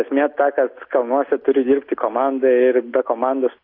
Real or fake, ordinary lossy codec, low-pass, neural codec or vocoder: real; AAC, 32 kbps; 5.4 kHz; none